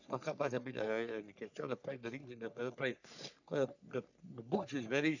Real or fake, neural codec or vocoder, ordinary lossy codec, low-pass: fake; codec, 44.1 kHz, 3.4 kbps, Pupu-Codec; none; 7.2 kHz